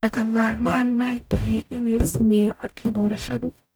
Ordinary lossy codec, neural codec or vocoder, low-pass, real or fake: none; codec, 44.1 kHz, 0.9 kbps, DAC; none; fake